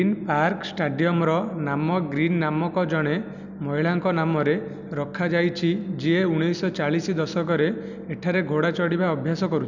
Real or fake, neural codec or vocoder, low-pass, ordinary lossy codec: real; none; 7.2 kHz; none